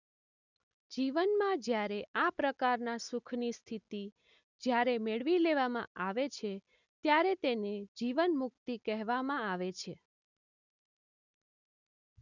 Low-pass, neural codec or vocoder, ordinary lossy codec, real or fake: 7.2 kHz; none; none; real